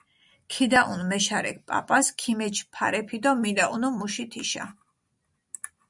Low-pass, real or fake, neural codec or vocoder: 10.8 kHz; real; none